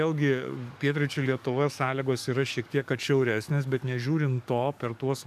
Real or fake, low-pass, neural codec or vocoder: fake; 14.4 kHz; codec, 44.1 kHz, 7.8 kbps, DAC